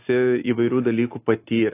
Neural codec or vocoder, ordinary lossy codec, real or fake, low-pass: none; AAC, 24 kbps; real; 3.6 kHz